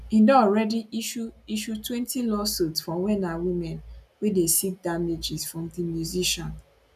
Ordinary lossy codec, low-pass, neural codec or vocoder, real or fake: none; 14.4 kHz; none; real